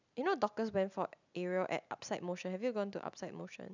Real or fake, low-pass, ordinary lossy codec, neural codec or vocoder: real; 7.2 kHz; none; none